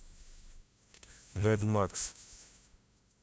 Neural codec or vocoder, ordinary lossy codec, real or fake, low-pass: codec, 16 kHz, 0.5 kbps, FunCodec, trained on LibriTTS, 25 frames a second; none; fake; none